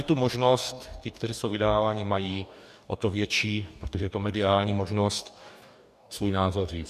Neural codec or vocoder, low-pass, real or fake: codec, 44.1 kHz, 2.6 kbps, DAC; 14.4 kHz; fake